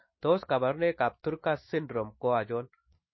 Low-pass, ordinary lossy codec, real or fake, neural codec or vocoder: 7.2 kHz; MP3, 24 kbps; fake; autoencoder, 48 kHz, 128 numbers a frame, DAC-VAE, trained on Japanese speech